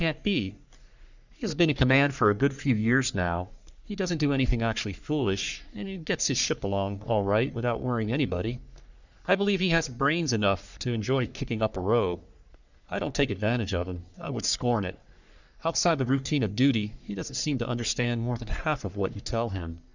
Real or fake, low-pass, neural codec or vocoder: fake; 7.2 kHz; codec, 44.1 kHz, 3.4 kbps, Pupu-Codec